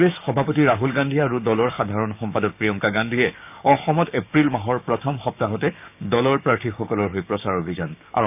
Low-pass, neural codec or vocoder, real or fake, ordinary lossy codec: 3.6 kHz; codec, 16 kHz, 6 kbps, DAC; fake; none